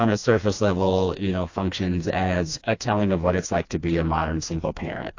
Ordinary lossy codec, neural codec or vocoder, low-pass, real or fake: AAC, 48 kbps; codec, 16 kHz, 2 kbps, FreqCodec, smaller model; 7.2 kHz; fake